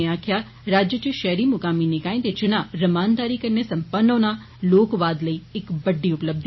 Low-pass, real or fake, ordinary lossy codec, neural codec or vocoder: 7.2 kHz; real; MP3, 24 kbps; none